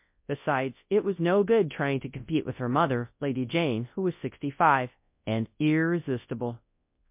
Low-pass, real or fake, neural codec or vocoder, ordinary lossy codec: 3.6 kHz; fake; codec, 24 kHz, 0.9 kbps, WavTokenizer, large speech release; MP3, 32 kbps